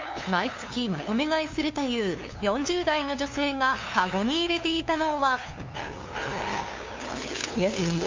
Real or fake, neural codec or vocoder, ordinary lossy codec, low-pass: fake; codec, 16 kHz, 2 kbps, FunCodec, trained on LibriTTS, 25 frames a second; MP3, 48 kbps; 7.2 kHz